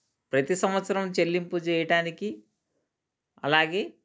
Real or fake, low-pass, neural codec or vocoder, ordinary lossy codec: real; none; none; none